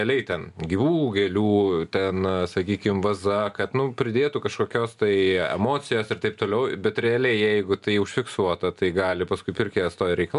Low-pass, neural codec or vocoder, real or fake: 10.8 kHz; none; real